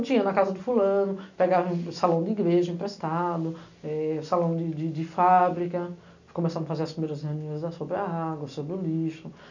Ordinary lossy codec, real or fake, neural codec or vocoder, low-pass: none; real; none; 7.2 kHz